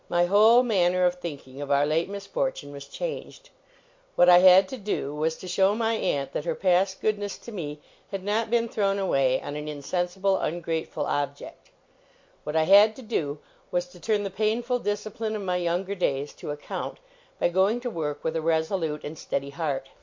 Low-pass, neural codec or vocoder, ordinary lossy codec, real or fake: 7.2 kHz; none; MP3, 48 kbps; real